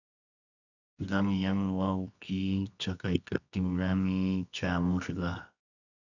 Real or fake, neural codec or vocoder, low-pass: fake; codec, 24 kHz, 0.9 kbps, WavTokenizer, medium music audio release; 7.2 kHz